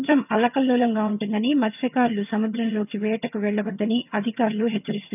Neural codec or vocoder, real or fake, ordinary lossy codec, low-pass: vocoder, 22.05 kHz, 80 mel bands, HiFi-GAN; fake; none; 3.6 kHz